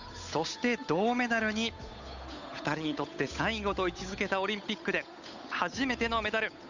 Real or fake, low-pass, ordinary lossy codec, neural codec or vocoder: fake; 7.2 kHz; none; codec, 16 kHz, 8 kbps, FunCodec, trained on Chinese and English, 25 frames a second